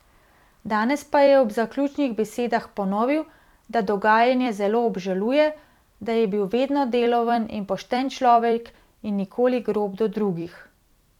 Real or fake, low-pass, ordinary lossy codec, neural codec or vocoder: fake; 19.8 kHz; none; vocoder, 44.1 kHz, 128 mel bands every 256 samples, BigVGAN v2